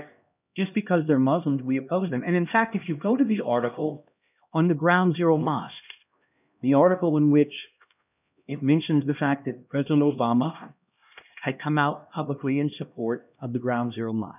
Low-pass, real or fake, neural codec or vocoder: 3.6 kHz; fake; codec, 16 kHz, 1 kbps, X-Codec, HuBERT features, trained on LibriSpeech